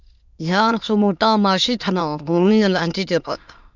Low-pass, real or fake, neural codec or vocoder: 7.2 kHz; fake; autoencoder, 22.05 kHz, a latent of 192 numbers a frame, VITS, trained on many speakers